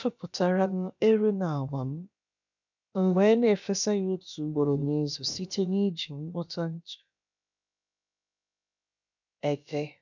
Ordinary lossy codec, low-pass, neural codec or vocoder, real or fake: none; 7.2 kHz; codec, 16 kHz, about 1 kbps, DyCAST, with the encoder's durations; fake